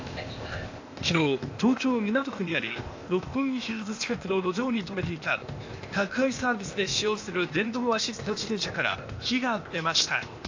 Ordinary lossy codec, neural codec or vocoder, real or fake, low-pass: AAC, 48 kbps; codec, 16 kHz, 0.8 kbps, ZipCodec; fake; 7.2 kHz